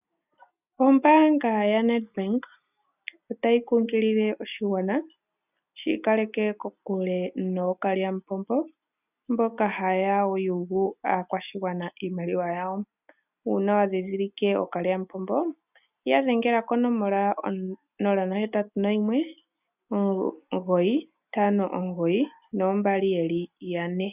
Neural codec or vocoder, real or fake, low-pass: none; real; 3.6 kHz